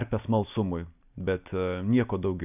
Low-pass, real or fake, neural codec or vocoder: 3.6 kHz; real; none